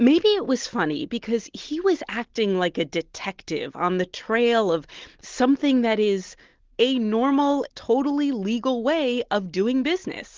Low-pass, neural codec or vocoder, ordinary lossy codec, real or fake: 7.2 kHz; none; Opus, 16 kbps; real